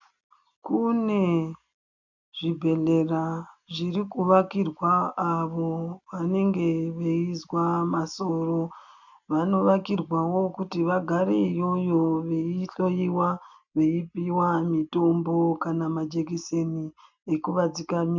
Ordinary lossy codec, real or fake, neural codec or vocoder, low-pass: MP3, 64 kbps; real; none; 7.2 kHz